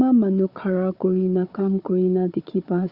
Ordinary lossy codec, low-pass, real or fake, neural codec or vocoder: Opus, 64 kbps; 5.4 kHz; fake; codec, 16 kHz, 4 kbps, FunCodec, trained on Chinese and English, 50 frames a second